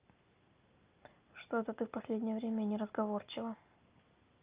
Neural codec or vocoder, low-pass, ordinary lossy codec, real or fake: none; 3.6 kHz; Opus, 24 kbps; real